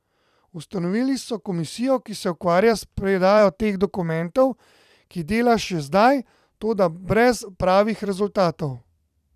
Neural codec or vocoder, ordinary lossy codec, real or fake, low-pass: vocoder, 44.1 kHz, 128 mel bands every 256 samples, BigVGAN v2; none; fake; 14.4 kHz